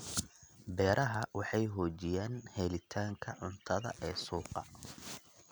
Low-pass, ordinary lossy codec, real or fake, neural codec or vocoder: none; none; real; none